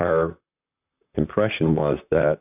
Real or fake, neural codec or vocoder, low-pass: fake; vocoder, 44.1 kHz, 128 mel bands, Pupu-Vocoder; 3.6 kHz